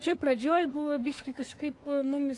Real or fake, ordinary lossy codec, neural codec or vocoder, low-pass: fake; AAC, 48 kbps; codec, 24 kHz, 1 kbps, SNAC; 10.8 kHz